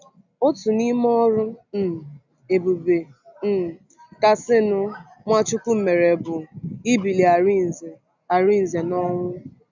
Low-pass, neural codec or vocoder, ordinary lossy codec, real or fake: 7.2 kHz; none; none; real